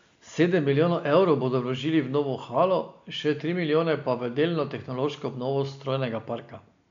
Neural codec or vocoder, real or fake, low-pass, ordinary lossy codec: none; real; 7.2 kHz; MP3, 64 kbps